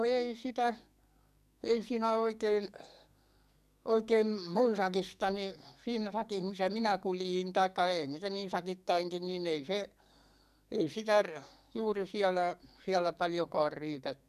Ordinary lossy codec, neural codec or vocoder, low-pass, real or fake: none; codec, 44.1 kHz, 2.6 kbps, SNAC; 14.4 kHz; fake